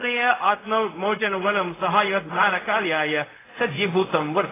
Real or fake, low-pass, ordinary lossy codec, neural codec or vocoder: fake; 3.6 kHz; AAC, 16 kbps; codec, 16 kHz, 0.4 kbps, LongCat-Audio-Codec